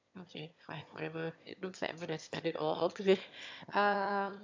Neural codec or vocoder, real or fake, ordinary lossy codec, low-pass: autoencoder, 22.05 kHz, a latent of 192 numbers a frame, VITS, trained on one speaker; fake; MP3, 64 kbps; 7.2 kHz